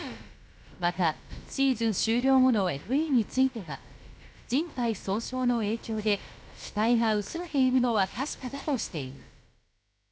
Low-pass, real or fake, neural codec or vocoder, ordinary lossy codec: none; fake; codec, 16 kHz, about 1 kbps, DyCAST, with the encoder's durations; none